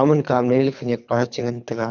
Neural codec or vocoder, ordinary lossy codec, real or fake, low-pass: codec, 24 kHz, 3 kbps, HILCodec; none; fake; 7.2 kHz